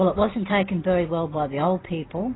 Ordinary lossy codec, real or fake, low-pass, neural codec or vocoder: AAC, 16 kbps; real; 7.2 kHz; none